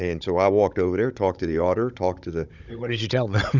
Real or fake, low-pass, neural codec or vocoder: fake; 7.2 kHz; codec, 16 kHz, 16 kbps, FunCodec, trained on Chinese and English, 50 frames a second